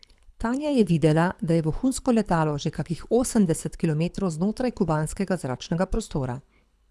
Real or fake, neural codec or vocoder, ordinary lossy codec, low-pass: fake; codec, 24 kHz, 6 kbps, HILCodec; none; none